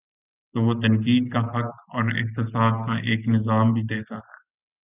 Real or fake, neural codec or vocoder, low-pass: real; none; 3.6 kHz